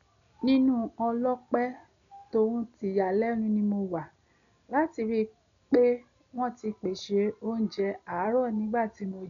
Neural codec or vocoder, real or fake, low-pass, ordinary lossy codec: none; real; 7.2 kHz; none